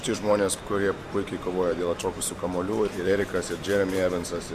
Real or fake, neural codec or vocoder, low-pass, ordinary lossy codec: fake; vocoder, 44.1 kHz, 128 mel bands every 512 samples, BigVGAN v2; 14.4 kHz; MP3, 96 kbps